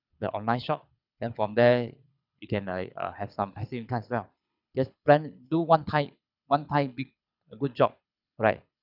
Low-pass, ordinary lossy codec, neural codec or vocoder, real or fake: 5.4 kHz; none; codec, 24 kHz, 6 kbps, HILCodec; fake